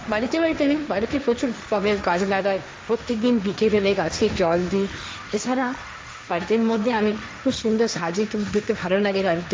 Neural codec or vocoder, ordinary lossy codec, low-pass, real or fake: codec, 16 kHz, 1.1 kbps, Voila-Tokenizer; none; none; fake